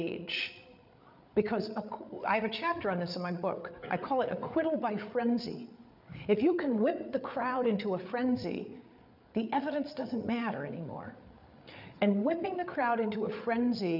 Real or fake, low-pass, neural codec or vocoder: fake; 5.4 kHz; codec, 16 kHz, 8 kbps, FreqCodec, larger model